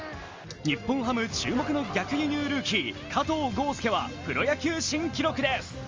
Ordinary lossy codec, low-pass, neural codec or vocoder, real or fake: Opus, 32 kbps; 7.2 kHz; none; real